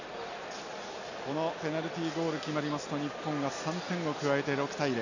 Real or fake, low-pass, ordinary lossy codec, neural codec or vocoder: real; 7.2 kHz; none; none